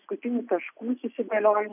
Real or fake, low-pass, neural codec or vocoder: real; 3.6 kHz; none